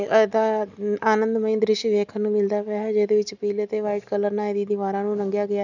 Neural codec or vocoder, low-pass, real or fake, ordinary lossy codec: none; 7.2 kHz; real; none